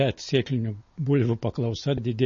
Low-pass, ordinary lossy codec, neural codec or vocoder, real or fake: 7.2 kHz; MP3, 32 kbps; none; real